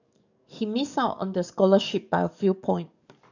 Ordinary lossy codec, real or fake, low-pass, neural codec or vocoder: none; fake; 7.2 kHz; codec, 44.1 kHz, 7.8 kbps, DAC